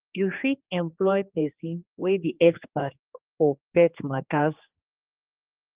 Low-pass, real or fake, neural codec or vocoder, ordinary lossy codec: 3.6 kHz; fake; codec, 16 kHz, 2 kbps, X-Codec, HuBERT features, trained on general audio; Opus, 32 kbps